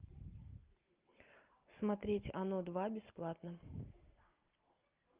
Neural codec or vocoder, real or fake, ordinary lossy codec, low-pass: none; real; Opus, 32 kbps; 3.6 kHz